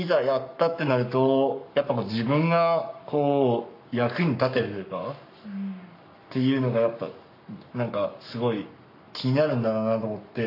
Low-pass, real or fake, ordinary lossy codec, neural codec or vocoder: 5.4 kHz; fake; MP3, 32 kbps; codec, 44.1 kHz, 7.8 kbps, Pupu-Codec